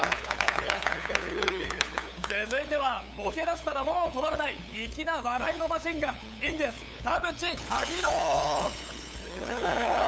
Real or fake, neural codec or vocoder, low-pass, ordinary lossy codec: fake; codec, 16 kHz, 8 kbps, FunCodec, trained on LibriTTS, 25 frames a second; none; none